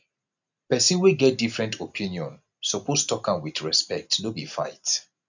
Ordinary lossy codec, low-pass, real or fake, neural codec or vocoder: none; 7.2 kHz; real; none